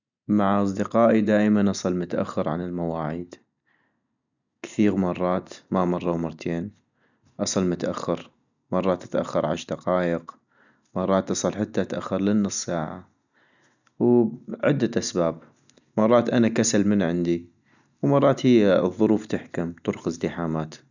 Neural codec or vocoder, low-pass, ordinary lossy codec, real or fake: none; 7.2 kHz; none; real